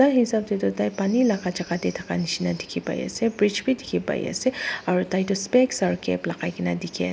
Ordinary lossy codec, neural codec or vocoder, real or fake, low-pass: none; none; real; none